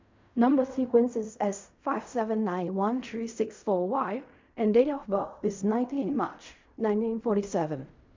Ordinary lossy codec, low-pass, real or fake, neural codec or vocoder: MP3, 64 kbps; 7.2 kHz; fake; codec, 16 kHz in and 24 kHz out, 0.4 kbps, LongCat-Audio-Codec, fine tuned four codebook decoder